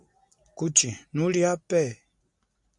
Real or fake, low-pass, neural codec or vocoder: real; 10.8 kHz; none